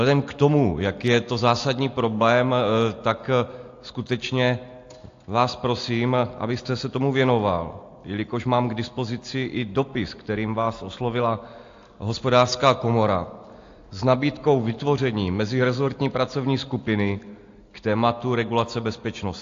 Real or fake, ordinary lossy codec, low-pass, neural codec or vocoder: real; AAC, 48 kbps; 7.2 kHz; none